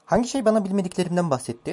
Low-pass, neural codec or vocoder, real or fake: 10.8 kHz; none; real